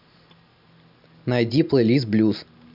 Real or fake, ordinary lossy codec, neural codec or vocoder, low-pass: real; none; none; 5.4 kHz